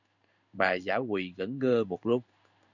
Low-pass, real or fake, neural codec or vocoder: 7.2 kHz; fake; codec, 16 kHz in and 24 kHz out, 1 kbps, XY-Tokenizer